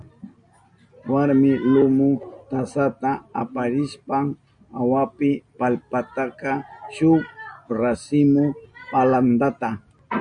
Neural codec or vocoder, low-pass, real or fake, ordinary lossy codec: none; 9.9 kHz; real; MP3, 48 kbps